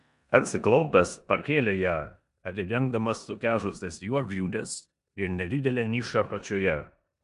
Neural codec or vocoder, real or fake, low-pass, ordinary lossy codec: codec, 16 kHz in and 24 kHz out, 0.9 kbps, LongCat-Audio-Codec, four codebook decoder; fake; 10.8 kHz; MP3, 96 kbps